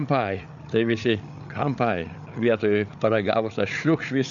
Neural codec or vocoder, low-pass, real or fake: codec, 16 kHz, 8 kbps, FreqCodec, larger model; 7.2 kHz; fake